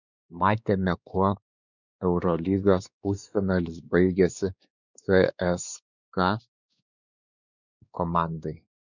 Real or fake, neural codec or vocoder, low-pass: fake; codec, 16 kHz, 2 kbps, X-Codec, WavLM features, trained on Multilingual LibriSpeech; 7.2 kHz